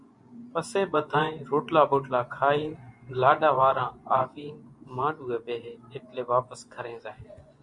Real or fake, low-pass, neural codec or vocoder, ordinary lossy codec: fake; 10.8 kHz; vocoder, 24 kHz, 100 mel bands, Vocos; MP3, 64 kbps